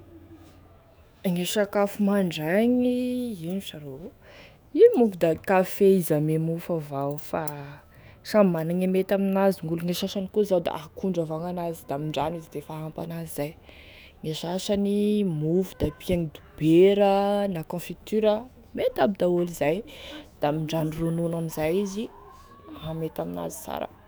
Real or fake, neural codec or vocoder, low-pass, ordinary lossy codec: fake; autoencoder, 48 kHz, 128 numbers a frame, DAC-VAE, trained on Japanese speech; none; none